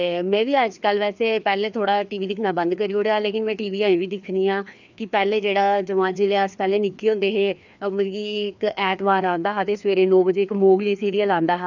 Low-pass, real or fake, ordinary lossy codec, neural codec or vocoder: 7.2 kHz; fake; none; codec, 16 kHz, 2 kbps, FreqCodec, larger model